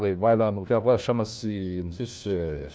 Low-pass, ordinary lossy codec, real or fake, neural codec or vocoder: none; none; fake; codec, 16 kHz, 1 kbps, FunCodec, trained on LibriTTS, 50 frames a second